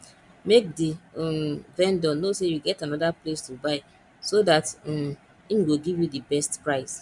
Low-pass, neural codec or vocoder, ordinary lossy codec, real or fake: 10.8 kHz; none; none; real